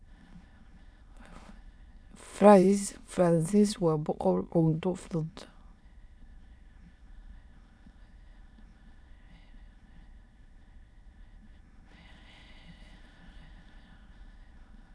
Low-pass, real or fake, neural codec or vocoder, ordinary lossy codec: none; fake; autoencoder, 22.05 kHz, a latent of 192 numbers a frame, VITS, trained on many speakers; none